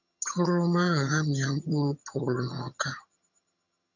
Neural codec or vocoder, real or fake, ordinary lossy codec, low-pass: vocoder, 22.05 kHz, 80 mel bands, HiFi-GAN; fake; none; 7.2 kHz